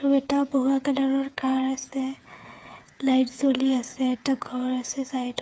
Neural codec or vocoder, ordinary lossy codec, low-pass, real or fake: codec, 16 kHz, 8 kbps, FreqCodec, smaller model; none; none; fake